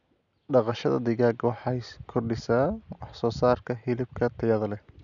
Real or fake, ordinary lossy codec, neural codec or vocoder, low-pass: real; none; none; 7.2 kHz